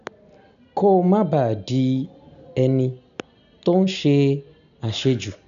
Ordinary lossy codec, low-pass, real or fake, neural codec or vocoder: none; 7.2 kHz; real; none